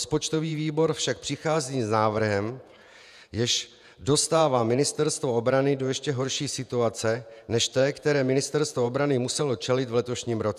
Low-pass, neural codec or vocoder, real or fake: 14.4 kHz; vocoder, 48 kHz, 128 mel bands, Vocos; fake